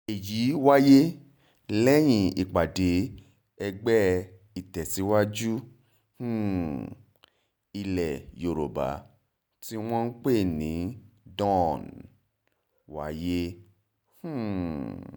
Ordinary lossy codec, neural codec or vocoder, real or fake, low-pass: none; none; real; none